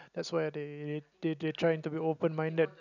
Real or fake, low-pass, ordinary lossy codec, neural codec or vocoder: real; 7.2 kHz; none; none